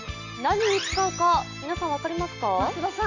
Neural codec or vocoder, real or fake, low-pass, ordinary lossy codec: none; real; 7.2 kHz; none